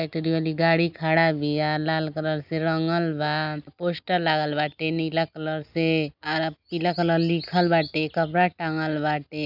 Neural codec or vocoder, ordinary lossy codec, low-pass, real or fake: none; none; 5.4 kHz; real